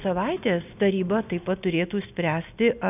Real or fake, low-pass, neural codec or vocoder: real; 3.6 kHz; none